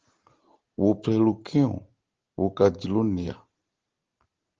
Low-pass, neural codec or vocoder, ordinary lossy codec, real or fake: 7.2 kHz; none; Opus, 16 kbps; real